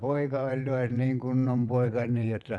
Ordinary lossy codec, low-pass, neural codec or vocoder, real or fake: none; none; vocoder, 22.05 kHz, 80 mel bands, WaveNeXt; fake